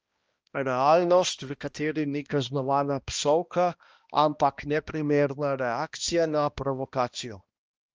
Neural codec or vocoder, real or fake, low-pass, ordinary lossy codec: codec, 16 kHz, 1 kbps, X-Codec, HuBERT features, trained on balanced general audio; fake; 7.2 kHz; Opus, 24 kbps